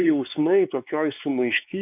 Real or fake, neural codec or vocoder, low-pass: fake; codec, 16 kHz, 4 kbps, X-Codec, WavLM features, trained on Multilingual LibriSpeech; 3.6 kHz